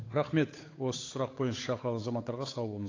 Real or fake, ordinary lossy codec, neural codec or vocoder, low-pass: fake; AAC, 32 kbps; codec, 16 kHz, 8 kbps, FunCodec, trained on Chinese and English, 25 frames a second; 7.2 kHz